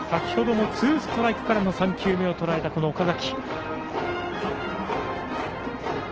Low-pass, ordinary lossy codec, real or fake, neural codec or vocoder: 7.2 kHz; Opus, 16 kbps; real; none